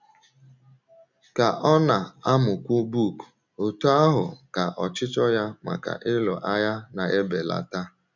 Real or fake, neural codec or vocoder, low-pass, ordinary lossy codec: real; none; 7.2 kHz; none